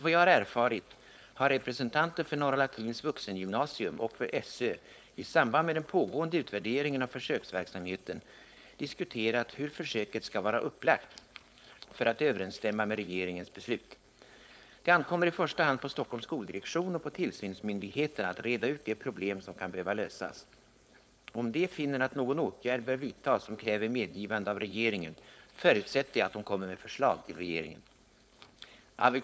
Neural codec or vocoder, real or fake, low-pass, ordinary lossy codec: codec, 16 kHz, 4.8 kbps, FACodec; fake; none; none